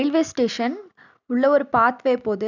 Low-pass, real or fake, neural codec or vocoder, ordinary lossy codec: 7.2 kHz; real; none; none